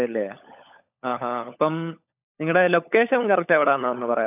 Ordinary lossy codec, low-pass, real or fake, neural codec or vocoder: none; 3.6 kHz; fake; codec, 16 kHz, 16 kbps, FunCodec, trained on LibriTTS, 50 frames a second